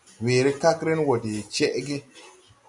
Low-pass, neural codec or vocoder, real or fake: 10.8 kHz; none; real